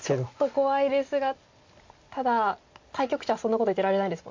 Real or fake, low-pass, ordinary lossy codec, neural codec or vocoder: real; 7.2 kHz; MP3, 48 kbps; none